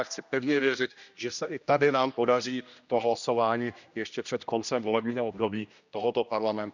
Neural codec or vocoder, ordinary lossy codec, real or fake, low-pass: codec, 16 kHz, 1 kbps, X-Codec, HuBERT features, trained on general audio; none; fake; 7.2 kHz